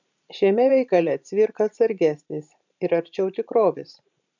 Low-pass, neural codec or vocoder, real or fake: 7.2 kHz; vocoder, 44.1 kHz, 128 mel bands every 512 samples, BigVGAN v2; fake